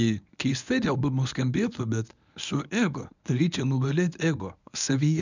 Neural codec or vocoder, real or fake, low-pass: codec, 24 kHz, 0.9 kbps, WavTokenizer, medium speech release version 1; fake; 7.2 kHz